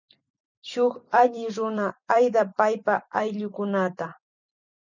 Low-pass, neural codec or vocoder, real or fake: 7.2 kHz; vocoder, 44.1 kHz, 128 mel bands every 256 samples, BigVGAN v2; fake